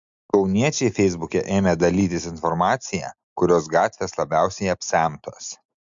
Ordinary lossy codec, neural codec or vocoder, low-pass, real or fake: MP3, 64 kbps; none; 7.2 kHz; real